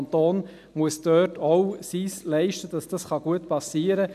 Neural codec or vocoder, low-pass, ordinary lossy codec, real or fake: none; 14.4 kHz; none; real